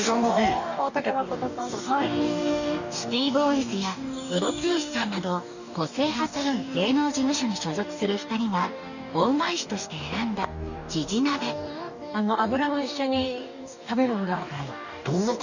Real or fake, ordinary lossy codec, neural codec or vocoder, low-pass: fake; none; codec, 44.1 kHz, 2.6 kbps, DAC; 7.2 kHz